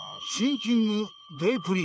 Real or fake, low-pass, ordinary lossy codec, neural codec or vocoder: fake; none; none; codec, 16 kHz, 4 kbps, FreqCodec, larger model